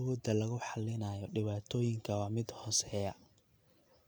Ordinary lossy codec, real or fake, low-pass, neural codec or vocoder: none; real; none; none